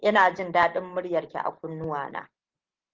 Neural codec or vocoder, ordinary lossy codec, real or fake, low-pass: none; Opus, 16 kbps; real; 7.2 kHz